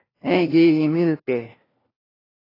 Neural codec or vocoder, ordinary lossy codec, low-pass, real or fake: codec, 16 kHz, 1 kbps, FunCodec, trained on LibriTTS, 50 frames a second; AAC, 24 kbps; 5.4 kHz; fake